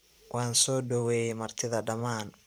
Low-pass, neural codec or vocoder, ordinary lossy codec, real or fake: none; vocoder, 44.1 kHz, 128 mel bands every 512 samples, BigVGAN v2; none; fake